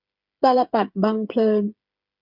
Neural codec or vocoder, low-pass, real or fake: codec, 16 kHz, 8 kbps, FreqCodec, smaller model; 5.4 kHz; fake